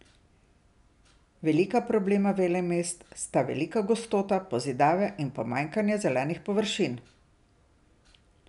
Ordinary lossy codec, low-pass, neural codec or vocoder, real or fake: none; 10.8 kHz; none; real